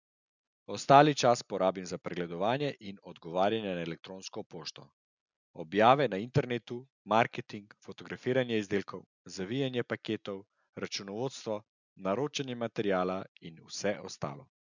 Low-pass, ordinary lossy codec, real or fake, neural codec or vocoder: 7.2 kHz; none; real; none